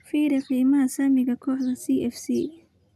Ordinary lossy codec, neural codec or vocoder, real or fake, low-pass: none; none; real; 14.4 kHz